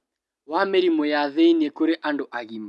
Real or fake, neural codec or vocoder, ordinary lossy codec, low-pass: real; none; none; none